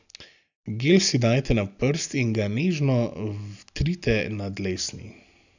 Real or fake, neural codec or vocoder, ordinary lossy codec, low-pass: real; none; none; 7.2 kHz